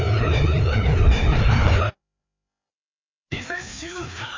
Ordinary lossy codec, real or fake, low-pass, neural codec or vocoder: MP3, 48 kbps; fake; 7.2 kHz; codec, 16 kHz, 2 kbps, FreqCodec, larger model